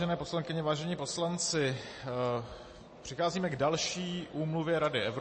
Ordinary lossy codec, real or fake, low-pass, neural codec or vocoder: MP3, 32 kbps; real; 9.9 kHz; none